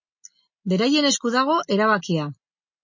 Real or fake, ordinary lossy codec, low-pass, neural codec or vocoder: real; MP3, 32 kbps; 7.2 kHz; none